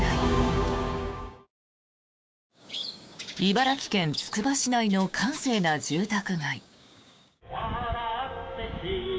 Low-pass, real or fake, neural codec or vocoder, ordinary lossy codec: none; fake; codec, 16 kHz, 6 kbps, DAC; none